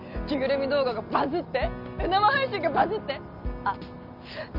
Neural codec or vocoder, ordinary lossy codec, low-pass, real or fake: none; none; 5.4 kHz; real